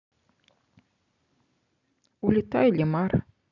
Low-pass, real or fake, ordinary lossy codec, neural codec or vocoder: 7.2 kHz; real; none; none